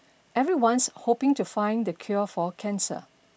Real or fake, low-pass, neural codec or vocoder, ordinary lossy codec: real; none; none; none